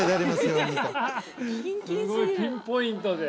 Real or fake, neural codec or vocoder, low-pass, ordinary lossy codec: real; none; none; none